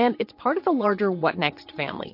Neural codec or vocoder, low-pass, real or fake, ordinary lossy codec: vocoder, 22.05 kHz, 80 mel bands, WaveNeXt; 5.4 kHz; fake; MP3, 32 kbps